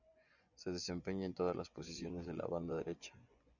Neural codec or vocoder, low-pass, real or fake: vocoder, 24 kHz, 100 mel bands, Vocos; 7.2 kHz; fake